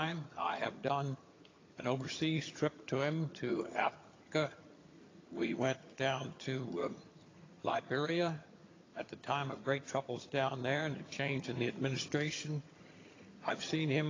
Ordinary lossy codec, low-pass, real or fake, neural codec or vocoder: AAC, 32 kbps; 7.2 kHz; fake; vocoder, 22.05 kHz, 80 mel bands, HiFi-GAN